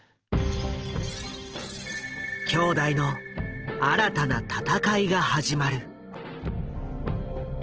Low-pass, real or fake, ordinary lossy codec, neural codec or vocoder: 7.2 kHz; real; Opus, 16 kbps; none